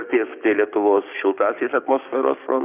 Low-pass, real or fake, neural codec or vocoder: 3.6 kHz; fake; codec, 16 kHz, 6 kbps, DAC